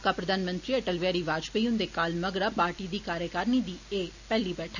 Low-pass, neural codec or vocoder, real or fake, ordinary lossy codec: 7.2 kHz; none; real; none